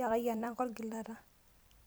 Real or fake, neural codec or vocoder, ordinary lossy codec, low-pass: fake; vocoder, 44.1 kHz, 128 mel bands every 256 samples, BigVGAN v2; none; none